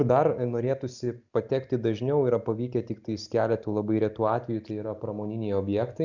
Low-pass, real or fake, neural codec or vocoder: 7.2 kHz; real; none